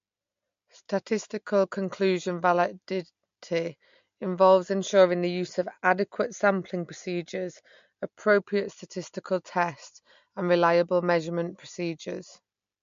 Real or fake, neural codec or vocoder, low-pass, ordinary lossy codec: real; none; 7.2 kHz; MP3, 48 kbps